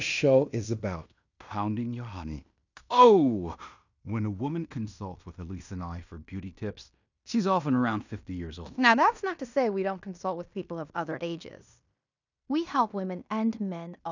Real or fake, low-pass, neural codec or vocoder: fake; 7.2 kHz; codec, 16 kHz in and 24 kHz out, 0.9 kbps, LongCat-Audio-Codec, fine tuned four codebook decoder